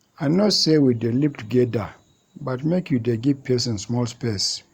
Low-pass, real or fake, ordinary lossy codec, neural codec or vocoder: 19.8 kHz; fake; Opus, 64 kbps; vocoder, 44.1 kHz, 128 mel bands every 512 samples, BigVGAN v2